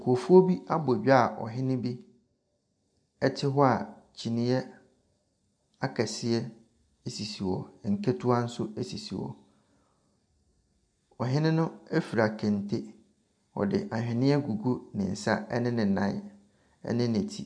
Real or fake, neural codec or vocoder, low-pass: real; none; 9.9 kHz